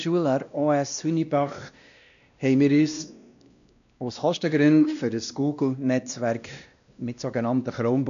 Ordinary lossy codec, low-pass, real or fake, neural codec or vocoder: none; 7.2 kHz; fake; codec, 16 kHz, 1 kbps, X-Codec, WavLM features, trained on Multilingual LibriSpeech